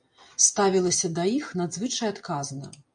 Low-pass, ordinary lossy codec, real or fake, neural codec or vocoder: 9.9 kHz; MP3, 64 kbps; real; none